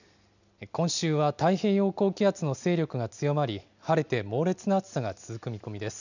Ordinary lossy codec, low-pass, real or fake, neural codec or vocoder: none; 7.2 kHz; real; none